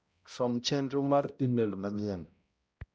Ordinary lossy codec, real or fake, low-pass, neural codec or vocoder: none; fake; none; codec, 16 kHz, 0.5 kbps, X-Codec, HuBERT features, trained on balanced general audio